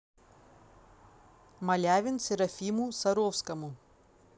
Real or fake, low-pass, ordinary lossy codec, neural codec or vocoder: real; none; none; none